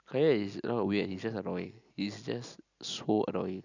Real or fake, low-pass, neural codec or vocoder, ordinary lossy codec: real; 7.2 kHz; none; none